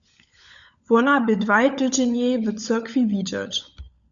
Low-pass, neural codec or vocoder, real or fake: 7.2 kHz; codec, 16 kHz, 16 kbps, FunCodec, trained on LibriTTS, 50 frames a second; fake